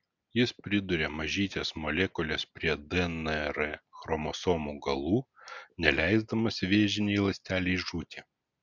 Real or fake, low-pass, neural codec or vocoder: real; 7.2 kHz; none